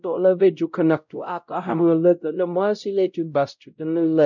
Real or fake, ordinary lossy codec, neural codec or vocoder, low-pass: fake; none; codec, 16 kHz, 0.5 kbps, X-Codec, WavLM features, trained on Multilingual LibriSpeech; 7.2 kHz